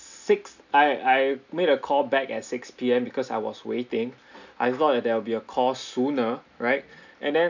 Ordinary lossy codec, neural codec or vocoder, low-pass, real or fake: none; none; 7.2 kHz; real